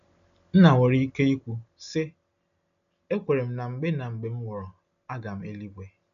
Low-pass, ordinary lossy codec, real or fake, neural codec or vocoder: 7.2 kHz; MP3, 64 kbps; real; none